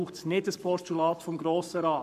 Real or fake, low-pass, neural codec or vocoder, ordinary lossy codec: fake; 14.4 kHz; codec, 44.1 kHz, 7.8 kbps, Pupu-Codec; none